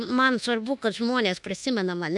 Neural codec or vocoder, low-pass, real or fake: codec, 24 kHz, 1.2 kbps, DualCodec; 10.8 kHz; fake